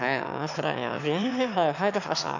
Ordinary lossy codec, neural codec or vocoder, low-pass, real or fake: none; autoencoder, 22.05 kHz, a latent of 192 numbers a frame, VITS, trained on one speaker; 7.2 kHz; fake